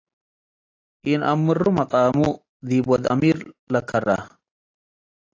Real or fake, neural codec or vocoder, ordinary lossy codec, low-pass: real; none; AAC, 32 kbps; 7.2 kHz